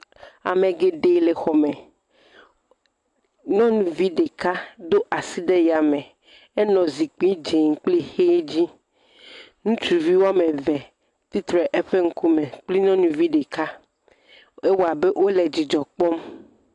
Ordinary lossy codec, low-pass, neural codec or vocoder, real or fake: AAC, 64 kbps; 10.8 kHz; none; real